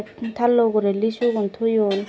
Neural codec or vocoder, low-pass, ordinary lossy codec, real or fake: none; none; none; real